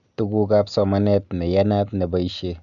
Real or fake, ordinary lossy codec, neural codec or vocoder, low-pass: real; none; none; 7.2 kHz